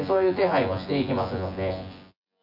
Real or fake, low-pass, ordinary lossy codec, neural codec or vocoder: fake; 5.4 kHz; none; vocoder, 24 kHz, 100 mel bands, Vocos